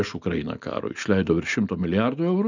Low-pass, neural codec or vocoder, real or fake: 7.2 kHz; none; real